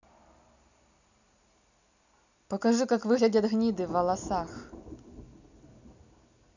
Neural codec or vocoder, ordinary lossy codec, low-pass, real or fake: none; none; 7.2 kHz; real